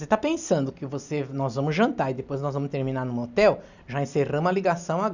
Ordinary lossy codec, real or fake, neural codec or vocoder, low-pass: none; real; none; 7.2 kHz